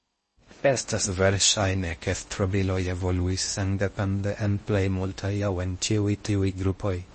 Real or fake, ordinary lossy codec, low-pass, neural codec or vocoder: fake; MP3, 32 kbps; 10.8 kHz; codec, 16 kHz in and 24 kHz out, 0.6 kbps, FocalCodec, streaming, 4096 codes